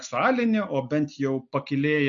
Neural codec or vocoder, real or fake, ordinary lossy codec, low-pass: none; real; MP3, 96 kbps; 7.2 kHz